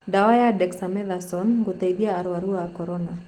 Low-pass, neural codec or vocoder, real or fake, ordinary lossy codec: 19.8 kHz; none; real; Opus, 16 kbps